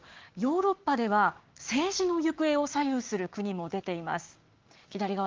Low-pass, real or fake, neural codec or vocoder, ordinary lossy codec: 7.2 kHz; fake; codec, 16 kHz, 6 kbps, DAC; Opus, 32 kbps